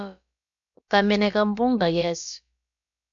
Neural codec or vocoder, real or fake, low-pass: codec, 16 kHz, about 1 kbps, DyCAST, with the encoder's durations; fake; 7.2 kHz